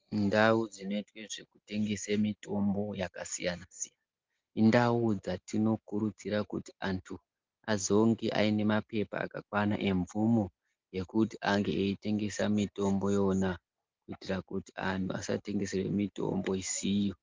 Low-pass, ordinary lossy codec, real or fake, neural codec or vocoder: 7.2 kHz; Opus, 32 kbps; real; none